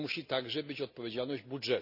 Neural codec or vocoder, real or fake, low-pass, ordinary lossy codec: none; real; 5.4 kHz; none